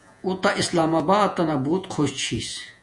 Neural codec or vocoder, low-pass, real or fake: vocoder, 48 kHz, 128 mel bands, Vocos; 10.8 kHz; fake